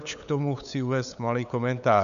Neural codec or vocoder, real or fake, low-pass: codec, 16 kHz, 8 kbps, FunCodec, trained on LibriTTS, 25 frames a second; fake; 7.2 kHz